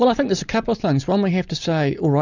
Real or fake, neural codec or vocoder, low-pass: real; none; 7.2 kHz